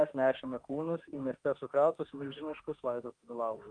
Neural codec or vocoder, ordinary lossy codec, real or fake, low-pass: autoencoder, 48 kHz, 32 numbers a frame, DAC-VAE, trained on Japanese speech; Opus, 32 kbps; fake; 9.9 kHz